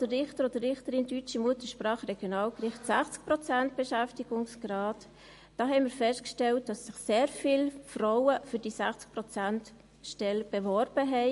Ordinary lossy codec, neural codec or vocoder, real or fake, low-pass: MP3, 48 kbps; none; real; 14.4 kHz